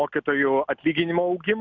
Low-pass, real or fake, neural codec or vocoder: 7.2 kHz; real; none